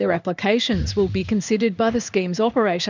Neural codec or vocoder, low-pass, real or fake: codec, 16 kHz in and 24 kHz out, 1 kbps, XY-Tokenizer; 7.2 kHz; fake